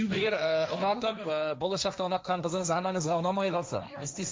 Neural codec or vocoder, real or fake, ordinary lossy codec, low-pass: codec, 16 kHz, 1.1 kbps, Voila-Tokenizer; fake; none; none